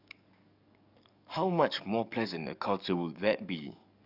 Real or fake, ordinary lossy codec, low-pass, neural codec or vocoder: fake; none; 5.4 kHz; codec, 44.1 kHz, 7.8 kbps, DAC